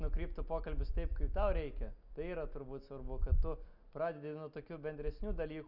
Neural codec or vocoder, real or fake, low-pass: none; real; 5.4 kHz